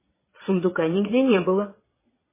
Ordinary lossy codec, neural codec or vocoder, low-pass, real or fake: MP3, 16 kbps; vocoder, 22.05 kHz, 80 mel bands, Vocos; 3.6 kHz; fake